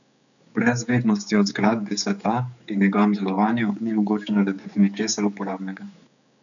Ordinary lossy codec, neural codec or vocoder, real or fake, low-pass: none; codec, 16 kHz, 4 kbps, X-Codec, HuBERT features, trained on general audio; fake; 7.2 kHz